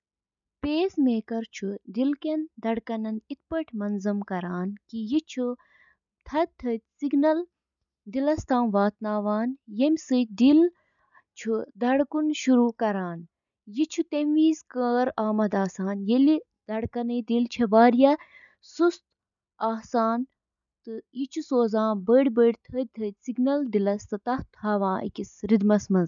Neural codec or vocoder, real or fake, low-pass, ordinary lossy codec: none; real; 7.2 kHz; none